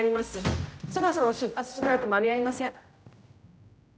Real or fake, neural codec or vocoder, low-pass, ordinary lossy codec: fake; codec, 16 kHz, 0.5 kbps, X-Codec, HuBERT features, trained on general audio; none; none